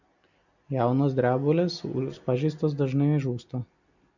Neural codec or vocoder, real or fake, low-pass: none; real; 7.2 kHz